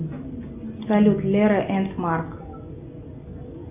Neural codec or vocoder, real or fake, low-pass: none; real; 3.6 kHz